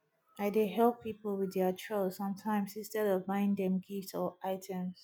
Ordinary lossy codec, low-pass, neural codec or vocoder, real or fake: none; none; none; real